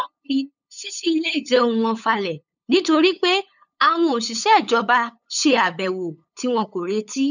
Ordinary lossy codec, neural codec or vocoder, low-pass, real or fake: none; codec, 16 kHz, 8 kbps, FunCodec, trained on LibriTTS, 25 frames a second; 7.2 kHz; fake